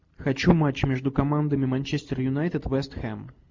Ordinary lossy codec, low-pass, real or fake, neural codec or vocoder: MP3, 64 kbps; 7.2 kHz; real; none